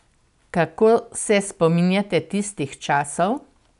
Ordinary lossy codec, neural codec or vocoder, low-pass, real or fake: none; none; 10.8 kHz; real